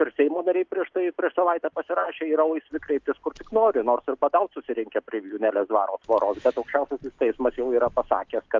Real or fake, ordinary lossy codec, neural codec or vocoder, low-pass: real; Opus, 24 kbps; none; 7.2 kHz